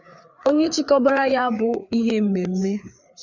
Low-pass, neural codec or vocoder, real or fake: 7.2 kHz; codec, 16 kHz, 4 kbps, FreqCodec, larger model; fake